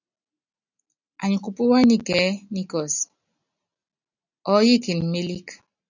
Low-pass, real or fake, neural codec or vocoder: 7.2 kHz; real; none